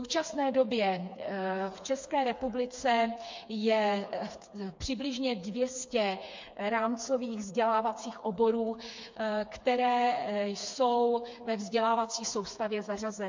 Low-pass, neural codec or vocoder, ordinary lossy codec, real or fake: 7.2 kHz; codec, 16 kHz, 4 kbps, FreqCodec, smaller model; MP3, 48 kbps; fake